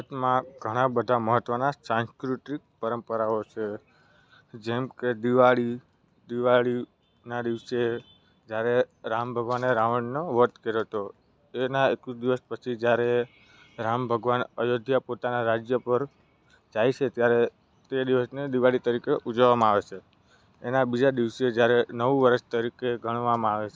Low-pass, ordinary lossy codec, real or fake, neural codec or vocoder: none; none; real; none